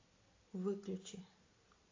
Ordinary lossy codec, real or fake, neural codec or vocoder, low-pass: AAC, 32 kbps; real; none; 7.2 kHz